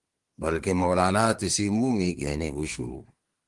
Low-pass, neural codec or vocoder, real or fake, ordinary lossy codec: 10.8 kHz; codec, 24 kHz, 1 kbps, SNAC; fake; Opus, 24 kbps